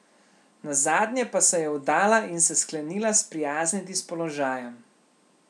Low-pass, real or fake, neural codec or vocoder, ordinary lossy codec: none; real; none; none